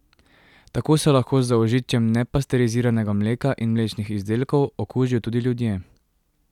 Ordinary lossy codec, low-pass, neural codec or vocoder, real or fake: none; 19.8 kHz; none; real